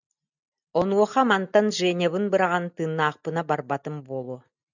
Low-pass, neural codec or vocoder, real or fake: 7.2 kHz; none; real